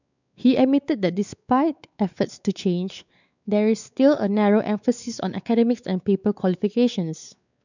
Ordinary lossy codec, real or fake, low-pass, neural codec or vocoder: none; fake; 7.2 kHz; codec, 16 kHz, 4 kbps, X-Codec, WavLM features, trained on Multilingual LibriSpeech